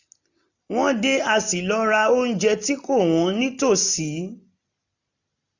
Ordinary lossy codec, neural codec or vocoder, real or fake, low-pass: none; none; real; 7.2 kHz